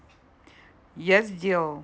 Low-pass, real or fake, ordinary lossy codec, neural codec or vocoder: none; real; none; none